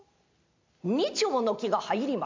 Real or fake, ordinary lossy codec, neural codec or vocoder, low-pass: real; MP3, 64 kbps; none; 7.2 kHz